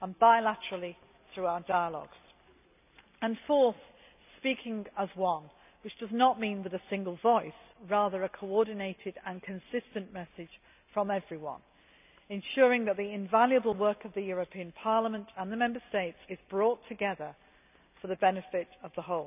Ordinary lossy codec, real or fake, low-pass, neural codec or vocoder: none; real; 3.6 kHz; none